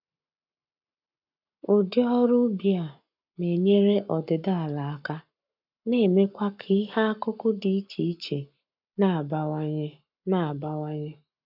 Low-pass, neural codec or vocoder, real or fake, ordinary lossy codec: 5.4 kHz; codec, 44.1 kHz, 7.8 kbps, Pupu-Codec; fake; none